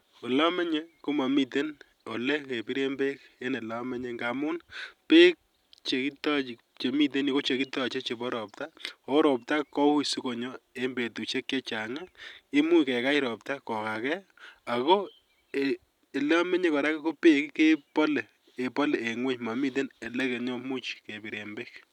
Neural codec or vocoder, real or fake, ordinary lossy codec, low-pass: none; real; none; 19.8 kHz